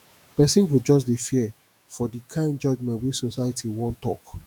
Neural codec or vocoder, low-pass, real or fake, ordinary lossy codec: autoencoder, 48 kHz, 128 numbers a frame, DAC-VAE, trained on Japanese speech; 19.8 kHz; fake; none